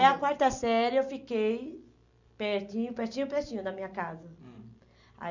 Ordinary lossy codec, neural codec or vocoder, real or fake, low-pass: none; none; real; 7.2 kHz